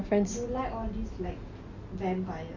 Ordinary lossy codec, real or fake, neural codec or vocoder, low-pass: Opus, 64 kbps; real; none; 7.2 kHz